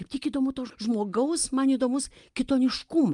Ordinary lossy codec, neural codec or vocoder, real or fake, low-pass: Opus, 32 kbps; none; real; 10.8 kHz